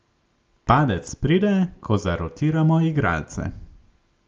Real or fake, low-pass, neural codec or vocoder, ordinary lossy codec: real; 7.2 kHz; none; Opus, 24 kbps